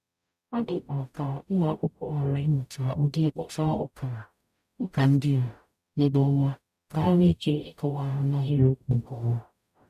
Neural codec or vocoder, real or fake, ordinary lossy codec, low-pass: codec, 44.1 kHz, 0.9 kbps, DAC; fake; none; 14.4 kHz